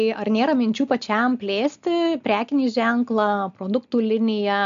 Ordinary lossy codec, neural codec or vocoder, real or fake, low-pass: MP3, 64 kbps; none; real; 7.2 kHz